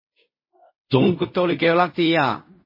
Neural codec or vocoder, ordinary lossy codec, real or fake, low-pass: codec, 16 kHz in and 24 kHz out, 0.4 kbps, LongCat-Audio-Codec, fine tuned four codebook decoder; MP3, 24 kbps; fake; 5.4 kHz